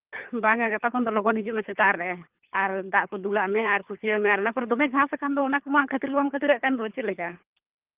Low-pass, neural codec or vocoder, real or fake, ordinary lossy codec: 3.6 kHz; codec, 24 kHz, 3 kbps, HILCodec; fake; Opus, 24 kbps